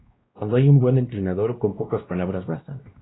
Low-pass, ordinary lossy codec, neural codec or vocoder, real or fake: 7.2 kHz; AAC, 16 kbps; codec, 16 kHz, 1 kbps, X-Codec, WavLM features, trained on Multilingual LibriSpeech; fake